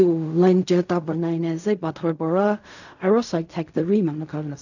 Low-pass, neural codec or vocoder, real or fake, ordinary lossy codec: 7.2 kHz; codec, 16 kHz in and 24 kHz out, 0.4 kbps, LongCat-Audio-Codec, fine tuned four codebook decoder; fake; none